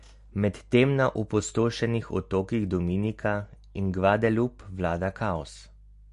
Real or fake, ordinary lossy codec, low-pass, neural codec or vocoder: real; MP3, 48 kbps; 14.4 kHz; none